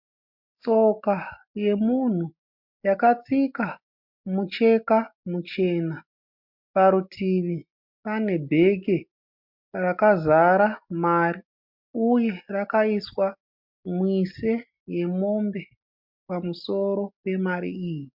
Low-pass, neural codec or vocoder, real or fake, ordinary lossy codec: 5.4 kHz; none; real; MP3, 48 kbps